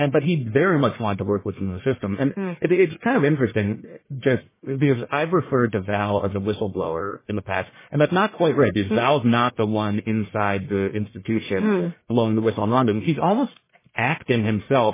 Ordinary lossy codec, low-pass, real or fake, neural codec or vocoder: MP3, 16 kbps; 3.6 kHz; fake; codec, 44.1 kHz, 1.7 kbps, Pupu-Codec